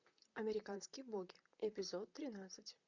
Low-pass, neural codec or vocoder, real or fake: 7.2 kHz; vocoder, 44.1 kHz, 128 mel bands, Pupu-Vocoder; fake